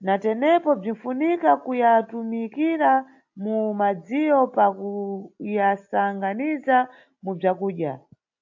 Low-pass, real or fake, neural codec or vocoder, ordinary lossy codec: 7.2 kHz; real; none; AAC, 48 kbps